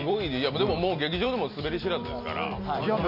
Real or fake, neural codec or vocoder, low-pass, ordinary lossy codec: real; none; 5.4 kHz; none